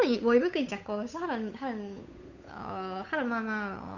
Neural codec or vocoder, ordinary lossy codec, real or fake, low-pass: codec, 16 kHz, 8 kbps, FunCodec, trained on Chinese and English, 25 frames a second; none; fake; 7.2 kHz